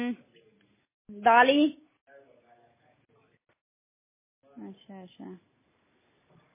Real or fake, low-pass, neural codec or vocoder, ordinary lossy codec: real; 3.6 kHz; none; MP3, 16 kbps